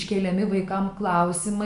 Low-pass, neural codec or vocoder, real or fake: 10.8 kHz; none; real